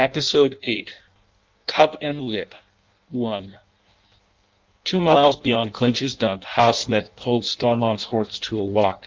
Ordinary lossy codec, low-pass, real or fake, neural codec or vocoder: Opus, 32 kbps; 7.2 kHz; fake; codec, 16 kHz in and 24 kHz out, 0.6 kbps, FireRedTTS-2 codec